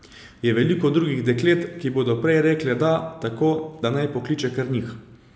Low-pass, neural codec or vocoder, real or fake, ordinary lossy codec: none; none; real; none